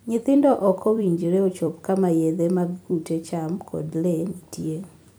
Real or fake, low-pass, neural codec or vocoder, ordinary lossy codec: fake; none; vocoder, 44.1 kHz, 128 mel bands every 256 samples, BigVGAN v2; none